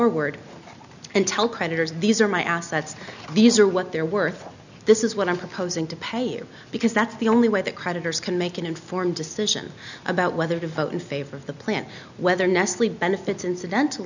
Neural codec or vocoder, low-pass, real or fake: none; 7.2 kHz; real